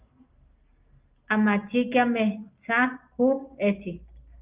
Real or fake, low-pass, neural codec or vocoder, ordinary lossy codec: real; 3.6 kHz; none; Opus, 24 kbps